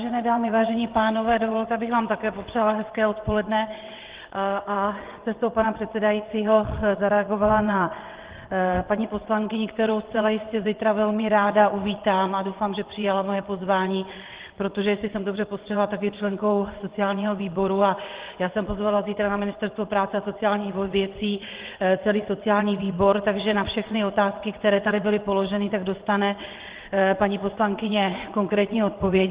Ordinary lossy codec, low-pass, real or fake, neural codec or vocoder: Opus, 16 kbps; 3.6 kHz; fake; vocoder, 22.05 kHz, 80 mel bands, WaveNeXt